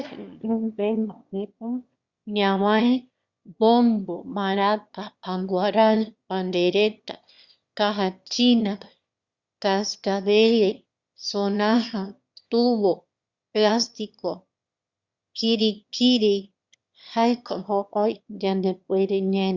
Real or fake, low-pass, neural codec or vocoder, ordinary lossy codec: fake; 7.2 kHz; autoencoder, 22.05 kHz, a latent of 192 numbers a frame, VITS, trained on one speaker; Opus, 64 kbps